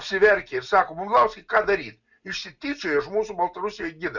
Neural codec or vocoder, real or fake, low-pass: none; real; 7.2 kHz